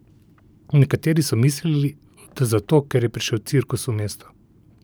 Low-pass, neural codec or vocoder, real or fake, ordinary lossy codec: none; vocoder, 44.1 kHz, 128 mel bands every 512 samples, BigVGAN v2; fake; none